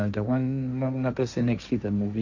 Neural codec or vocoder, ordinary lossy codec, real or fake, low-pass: codec, 16 kHz, 1.1 kbps, Voila-Tokenizer; none; fake; 7.2 kHz